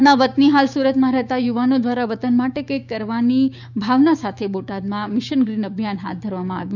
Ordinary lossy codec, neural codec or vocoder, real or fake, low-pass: none; codec, 16 kHz, 6 kbps, DAC; fake; 7.2 kHz